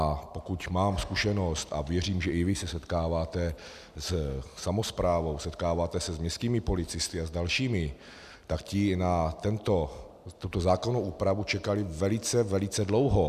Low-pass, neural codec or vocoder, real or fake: 14.4 kHz; none; real